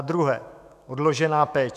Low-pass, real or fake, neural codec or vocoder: 14.4 kHz; fake; autoencoder, 48 kHz, 128 numbers a frame, DAC-VAE, trained on Japanese speech